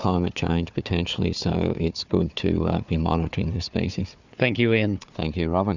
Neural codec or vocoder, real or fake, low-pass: codec, 16 kHz, 4 kbps, FunCodec, trained on Chinese and English, 50 frames a second; fake; 7.2 kHz